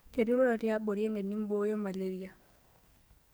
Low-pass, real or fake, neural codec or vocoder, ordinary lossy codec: none; fake; codec, 44.1 kHz, 2.6 kbps, SNAC; none